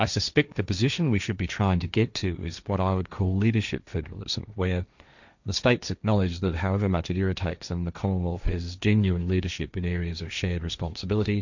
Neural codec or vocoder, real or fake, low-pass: codec, 16 kHz, 1.1 kbps, Voila-Tokenizer; fake; 7.2 kHz